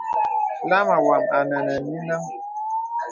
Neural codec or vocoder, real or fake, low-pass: none; real; 7.2 kHz